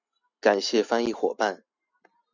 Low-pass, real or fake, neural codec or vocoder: 7.2 kHz; real; none